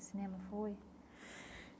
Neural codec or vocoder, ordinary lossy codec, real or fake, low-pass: none; none; real; none